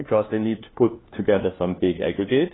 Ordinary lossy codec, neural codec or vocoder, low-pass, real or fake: AAC, 16 kbps; codec, 16 kHz, 1 kbps, FunCodec, trained on LibriTTS, 50 frames a second; 7.2 kHz; fake